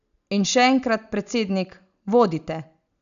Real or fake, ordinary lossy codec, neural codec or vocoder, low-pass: real; none; none; 7.2 kHz